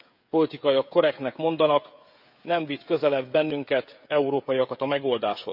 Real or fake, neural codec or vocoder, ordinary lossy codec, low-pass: fake; codec, 16 kHz, 16 kbps, FreqCodec, smaller model; none; 5.4 kHz